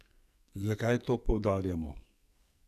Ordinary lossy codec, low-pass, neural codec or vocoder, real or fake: none; 14.4 kHz; codec, 44.1 kHz, 2.6 kbps, SNAC; fake